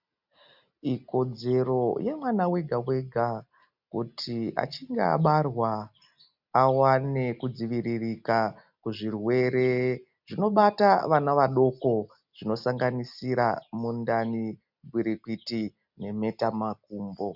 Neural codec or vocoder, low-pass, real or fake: none; 5.4 kHz; real